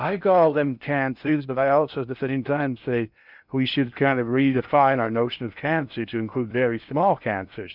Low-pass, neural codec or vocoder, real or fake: 5.4 kHz; codec, 16 kHz in and 24 kHz out, 0.6 kbps, FocalCodec, streaming, 2048 codes; fake